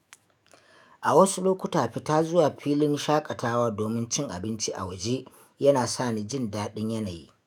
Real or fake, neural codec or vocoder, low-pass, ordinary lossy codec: fake; autoencoder, 48 kHz, 128 numbers a frame, DAC-VAE, trained on Japanese speech; 19.8 kHz; none